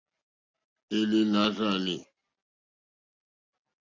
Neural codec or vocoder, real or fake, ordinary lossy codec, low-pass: none; real; AAC, 48 kbps; 7.2 kHz